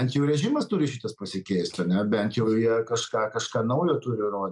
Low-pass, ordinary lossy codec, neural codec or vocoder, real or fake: 10.8 kHz; MP3, 96 kbps; none; real